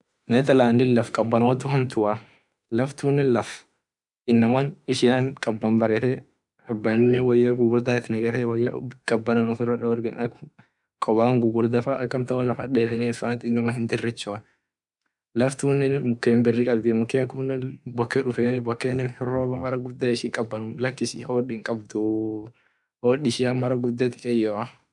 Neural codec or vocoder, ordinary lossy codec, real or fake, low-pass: autoencoder, 48 kHz, 32 numbers a frame, DAC-VAE, trained on Japanese speech; none; fake; 10.8 kHz